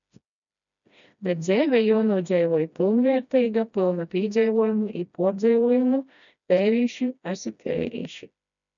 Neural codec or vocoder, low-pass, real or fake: codec, 16 kHz, 1 kbps, FreqCodec, smaller model; 7.2 kHz; fake